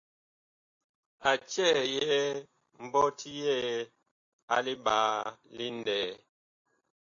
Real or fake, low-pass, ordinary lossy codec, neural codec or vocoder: real; 7.2 kHz; AAC, 64 kbps; none